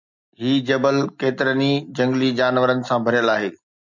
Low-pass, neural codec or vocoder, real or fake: 7.2 kHz; none; real